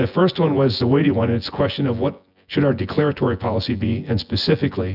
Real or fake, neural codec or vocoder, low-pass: fake; vocoder, 24 kHz, 100 mel bands, Vocos; 5.4 kHz